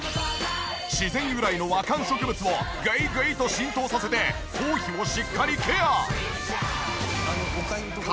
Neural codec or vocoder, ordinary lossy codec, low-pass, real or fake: none; none; none; real